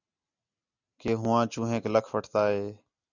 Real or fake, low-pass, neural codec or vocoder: real; 7.2 kHz; none